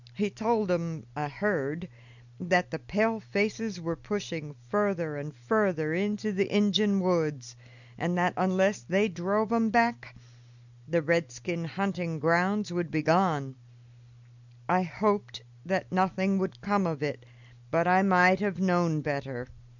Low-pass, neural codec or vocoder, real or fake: 7.2 kHz; none; real